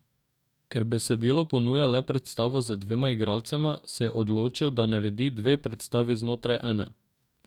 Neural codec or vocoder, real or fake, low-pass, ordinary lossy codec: codec, 44.1 kHz, 2.6 kbps, DAC; fake; 19.8 kHz; none